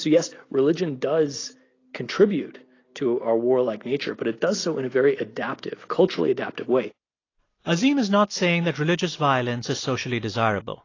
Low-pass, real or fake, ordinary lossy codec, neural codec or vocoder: 7.2 kHz; real; AAC, 32 kbps; none